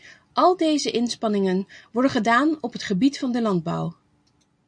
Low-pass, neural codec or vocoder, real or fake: 9.9 kHz; none; real